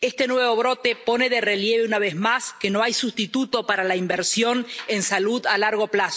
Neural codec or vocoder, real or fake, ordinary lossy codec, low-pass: none; real; none; none